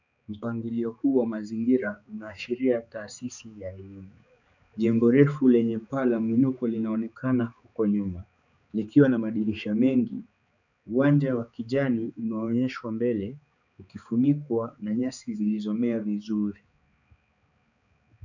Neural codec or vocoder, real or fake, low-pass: codec, 16 kHz, 4 kbps, X-Codec, HuBERT features, trained on balanced general audio; fake; 7.2 kHz